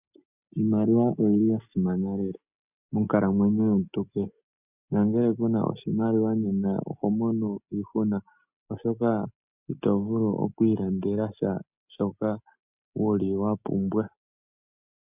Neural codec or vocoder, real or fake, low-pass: none; real; 3.6 kHz